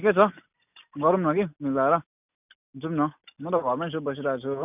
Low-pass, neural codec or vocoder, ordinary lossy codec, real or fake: 3.6 kHz; none; none; real